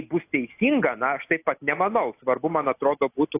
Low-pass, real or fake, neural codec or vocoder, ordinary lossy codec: 3.6 kHz; real; none; AAC, 24 kbps